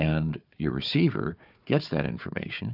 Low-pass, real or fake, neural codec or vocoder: 5.4 kHz; fake; codec, 16 kHz, 4 kbps, FunCodec, trained on Chinese and English, 50 frames a second